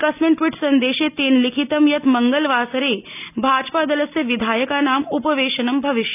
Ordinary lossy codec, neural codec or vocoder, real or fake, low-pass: none; none; real; 3.6 kHz